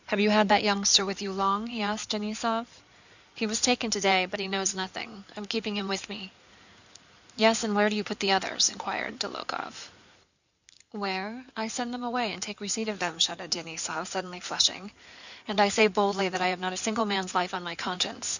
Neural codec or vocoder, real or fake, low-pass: codec, 16 kHz in and 24 kHz out, 2.2 kbps, FireRedTTS-2 codec; fake; 7.2 kHz